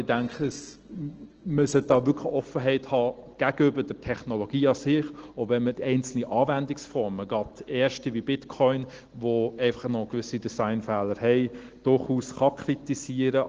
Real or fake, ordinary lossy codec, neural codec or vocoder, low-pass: real; Opus, 16 kbps; none; 7.2 kHz